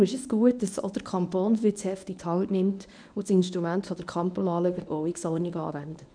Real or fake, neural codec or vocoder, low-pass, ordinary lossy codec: fake; codec, 24 kHz, 0.9 kbps, WavTokenizer, medium speech release version 1; 9.9 kHz; none